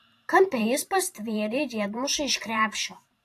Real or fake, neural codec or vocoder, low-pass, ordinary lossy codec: fake; vocoder, 44.1 kHz, 128 mel bands every 512 samples, BigVGAN v2; 14.4 kHz; AAC, 64 kbps